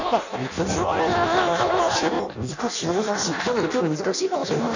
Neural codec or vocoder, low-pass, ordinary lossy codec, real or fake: codec, 16 kHz in and 24 kHz out, 0.6 kbps, FireRedTTS-2 codec; 7.2 kHz; none; fake